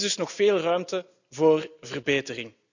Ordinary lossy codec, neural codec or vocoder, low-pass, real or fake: none; none; 7.2 kHz; real